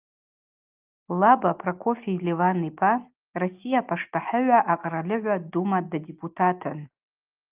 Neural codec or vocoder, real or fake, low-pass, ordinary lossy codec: none; real; 3.6 kHz; Opus, 32 kbps